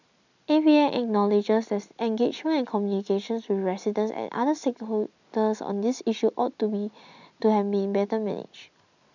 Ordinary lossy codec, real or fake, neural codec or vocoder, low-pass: none; real; none; 7.2 kHz